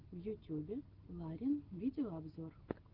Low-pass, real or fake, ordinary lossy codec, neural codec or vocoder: 5.4 kHz; real; Opus, 16 kbps; none